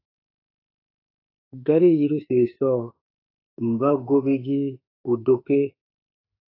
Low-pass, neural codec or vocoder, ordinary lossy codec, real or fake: 5.4 kHz; autoencoder, 48 kHz, 32 numbers a frame, DAC-VAE, trained on Japanese speech; AAC, 24 kbps; fake